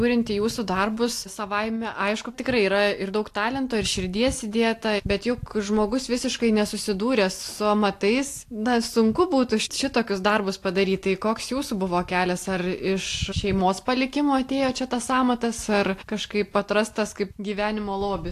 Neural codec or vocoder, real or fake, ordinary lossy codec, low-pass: none; real; AAC, 64 kbps; 14.4 kHz